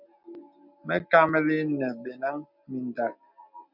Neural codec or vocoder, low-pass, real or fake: none; 5.4 kHz; real